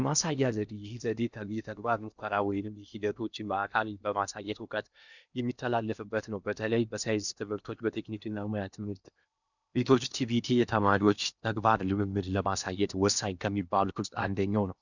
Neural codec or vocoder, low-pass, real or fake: codec, 16 kHz in and 24 kHz out, 0.8 kbps, FocalCodec, streaming, 65536 codes; 7.2 kHz; fake